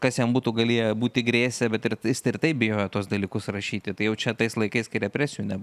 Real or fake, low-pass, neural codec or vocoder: real; 14.4 kHz; none